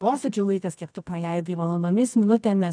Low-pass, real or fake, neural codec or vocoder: 9.9 kHz; fake; codec, 24 kHz, 0.9 kbps, WavTokenizer, medium music audio release